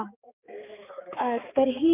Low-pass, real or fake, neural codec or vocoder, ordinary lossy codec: 3.6 kHz; fake; codec, 16 kHz, 4 kbps, X-Codec, HuBERT features, trained on balanced general audio; none